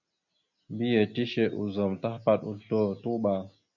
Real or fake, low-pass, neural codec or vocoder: real; 7.2 kHz; none